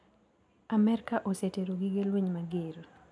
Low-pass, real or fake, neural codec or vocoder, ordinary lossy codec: none; real; none; none